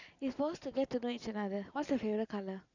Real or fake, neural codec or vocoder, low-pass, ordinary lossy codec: real; none; 7.2 kHz; none